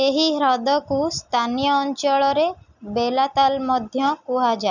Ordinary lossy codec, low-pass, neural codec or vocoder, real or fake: none; 7.2 kHz; none; real